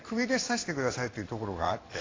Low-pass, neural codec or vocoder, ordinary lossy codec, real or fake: 7.2 kHz; codec, 16 kHz in and 24 kHz out, 1 kbps, XY-Tokenizer; AAC, 32 kbps; fake